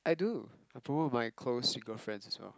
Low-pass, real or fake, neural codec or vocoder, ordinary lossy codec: none; real; none; none